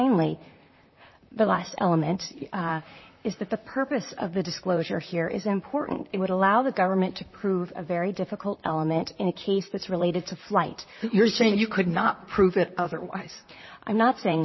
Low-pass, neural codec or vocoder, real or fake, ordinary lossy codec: 7.2 kHz; vocoder, 44.1 kHz, 128 mel bands, Pupu-Vocoder; fake; MP3, 24 kbps